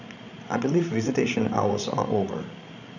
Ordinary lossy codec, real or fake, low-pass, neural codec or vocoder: none; fake; 7.2 kHz; codec, 16 kHz, 16 kbps, FreqCodec, larger model